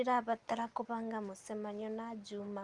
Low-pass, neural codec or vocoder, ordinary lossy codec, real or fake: 10.8 kHz; none; Opus, 32 kbps; real